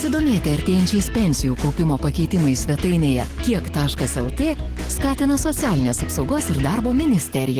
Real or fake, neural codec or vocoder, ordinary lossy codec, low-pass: fake; codec, 44.1 kHz, 7.8 kbps, DAC; Opus, 16 kbps; 14.4 kHz